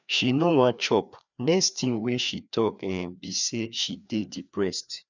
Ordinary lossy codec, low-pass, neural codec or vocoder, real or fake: none; 7.2 kHz; codec, 16 kHz, 2 kbps, FreqCodec, larger model; fake